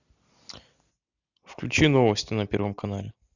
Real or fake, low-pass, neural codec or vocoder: real; 7.2 kHz; none